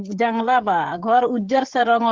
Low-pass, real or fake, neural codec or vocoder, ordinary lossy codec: 7.2 kHz; fake; codec, 16 kHz, 16 kbps, FreqCodec, smaller model; Opus, 16 kbps